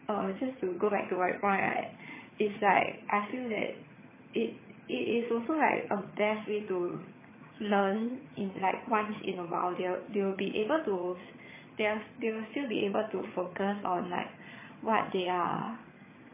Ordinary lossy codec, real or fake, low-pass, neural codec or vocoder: MP3, 16 kbps; fake; 3.6 kHz; vocoder, 22.05 kHz, 80 mel bands, HiFi-GAN